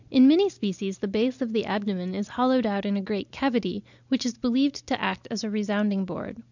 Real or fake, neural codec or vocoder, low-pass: real; none; 7.2 kHz